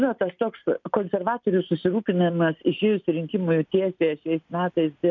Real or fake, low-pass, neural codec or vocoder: real; 7.2 kHz; none